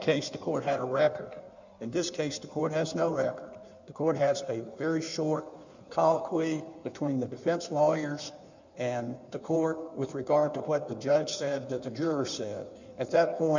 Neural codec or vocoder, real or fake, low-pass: codec, 16 kHz in and 24 kHz out, 1.1 kbps, FireRedTTS-2 codec; fake; 7.2 kHz